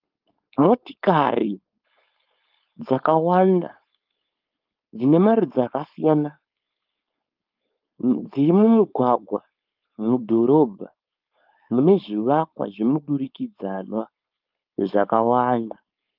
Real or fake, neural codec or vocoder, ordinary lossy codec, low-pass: fake; codec, 16 kHz, 4.8 kbps, FACodec; Opus, 32 kbps; 5.4 kHz